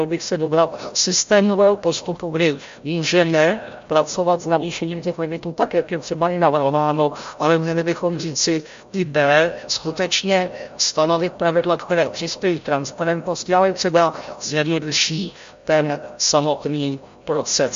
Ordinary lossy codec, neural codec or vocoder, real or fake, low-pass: MP3, 48 kbps; codec, 16 kHz, 0.5 kbps, FreqCodec, larger model; fake; 7.2 kHz